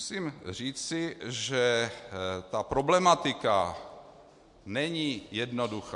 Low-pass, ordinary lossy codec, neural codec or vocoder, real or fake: 10.8 kHz; MP3, 64 kbps; none; real